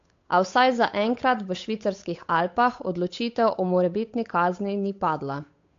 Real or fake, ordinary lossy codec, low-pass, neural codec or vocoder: fake; AAC, 48 kbps; 7.2 kHz; codec, 16 kHz, 8 kbps, FunCodec, trained on Chinese and English, 25 frames a second